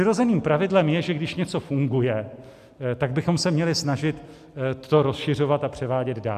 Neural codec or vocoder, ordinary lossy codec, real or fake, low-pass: none; AAC, 96 kbps; real; 14.4 kHz